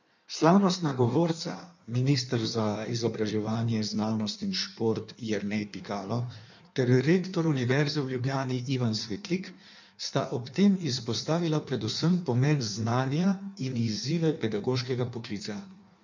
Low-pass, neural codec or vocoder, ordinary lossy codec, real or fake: 7.2 kHz; codec, 16 kHz in and 24 kHz out, 1.1 kbps, FireRedTTS-2 codec; none; fake